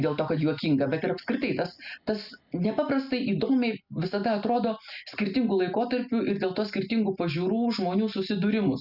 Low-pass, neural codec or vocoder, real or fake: 5.4 kHz; none; real